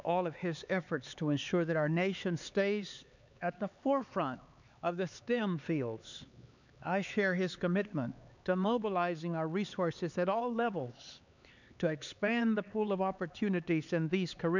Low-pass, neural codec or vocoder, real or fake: 7.2 kHz; codec, 16 kHz, 4 kbps, X-Codec, HuBERT features, trained on LibriSpeech; fake